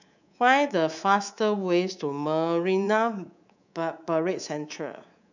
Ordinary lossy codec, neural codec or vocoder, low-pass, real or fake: none; codec, 24 kHz, 3.1 kbps, DualCodec; 7.2 kHz; fake